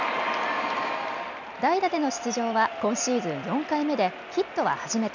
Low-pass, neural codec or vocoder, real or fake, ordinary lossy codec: 7.2 kHz; none; real; none